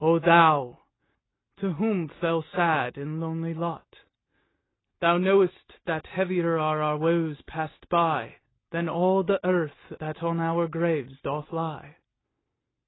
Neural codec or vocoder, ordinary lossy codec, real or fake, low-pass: none; AAC, 16 kbps; real; 7.2 kHz